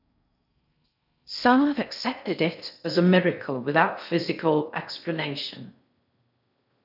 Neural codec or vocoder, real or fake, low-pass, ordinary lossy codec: codec, 16 kHz in and 24 kHz out, 0.6 kbps, FocalCodec, streaming, 4096 codes; fake; 5.4 kHz; none